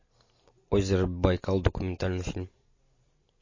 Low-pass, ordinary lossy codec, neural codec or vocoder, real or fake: 7.2 kHz; MP3, 32 kbps; none; real